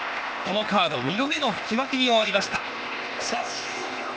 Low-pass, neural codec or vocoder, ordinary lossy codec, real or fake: none; codec, 16 kHz, 0.8 kbps, ZipCodec; none; fake